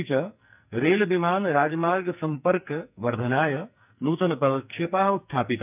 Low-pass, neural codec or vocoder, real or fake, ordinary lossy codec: 3.6 kHz; codec, 44.1 kHz, 2.6 kbps, SNAC; fake; none